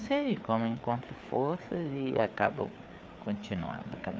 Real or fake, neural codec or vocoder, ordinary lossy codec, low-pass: fake; codec, 16 kHz, 4 kbps, FreqCodec, larger model; none; none